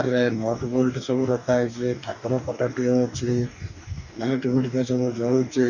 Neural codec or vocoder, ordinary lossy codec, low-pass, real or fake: codec, 44.1 kHz, 2.6 kbps, DAC; none; 7.2 kHz; fake